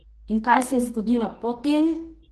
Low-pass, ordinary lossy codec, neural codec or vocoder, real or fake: 10.8 kHz; Opus, 16 kbps; codec, 24 kHz, 0.9 kbps, WavTokenizer, medium music audio release; fake